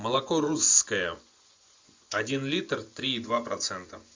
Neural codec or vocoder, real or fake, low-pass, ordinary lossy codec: vocoder, 24 kHz, 100 mel bands, Vocos; fake; 7.2 kHz; MP3, 64 kbps